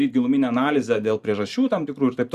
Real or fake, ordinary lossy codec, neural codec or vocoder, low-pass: fake; Opus, 64 kbps; vocoder, 44.1 kHz, 128 mel bands every 512 samples, BigVGAN v2; 14.4 kHz